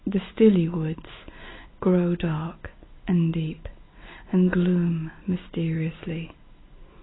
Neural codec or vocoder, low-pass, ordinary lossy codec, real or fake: none; 7.2 kHz; AAC, 16 kbps; real